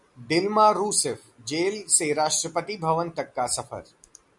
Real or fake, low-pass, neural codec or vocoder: real; 10.8 kHz; none